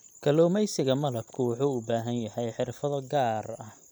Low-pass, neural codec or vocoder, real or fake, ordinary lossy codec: none; none; real; none